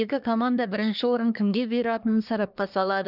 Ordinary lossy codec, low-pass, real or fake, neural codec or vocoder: none; 5.4 kHz; fake; codec, 24 kHz, 1 kbps, SNAC